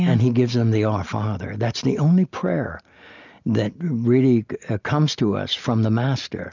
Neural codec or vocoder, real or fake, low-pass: none; real; 7.2 kHz